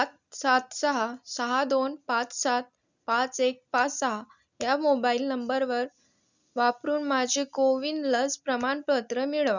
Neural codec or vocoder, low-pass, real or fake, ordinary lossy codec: none; 7.2 kHz; real; none